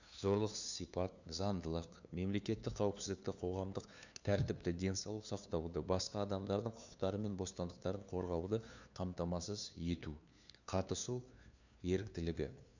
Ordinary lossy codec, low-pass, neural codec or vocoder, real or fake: none; 7.2 kHz; codec, 16 kHz, 2 kbps, FunCodec, trained on LibriTTS, 25 frames a second; fake